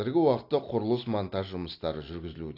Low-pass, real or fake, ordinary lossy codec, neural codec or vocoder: 5.4 kHz; real; AAC, 32 kbps; none